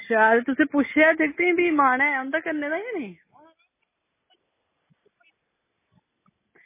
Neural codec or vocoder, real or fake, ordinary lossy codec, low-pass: none; real; MP3, 16 kbps; 3.6 kHz